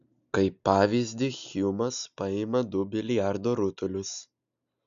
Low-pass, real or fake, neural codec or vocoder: 7.2 kHz; real; none